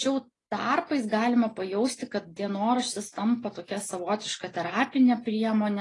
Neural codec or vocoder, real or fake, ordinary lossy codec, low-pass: none; real; AAC, 32 kbps; 10.8 kHz